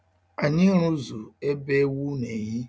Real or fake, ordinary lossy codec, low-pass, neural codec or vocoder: real; none; none; none